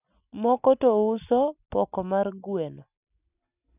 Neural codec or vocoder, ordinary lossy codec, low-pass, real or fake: none; none; 3.6 kHz; real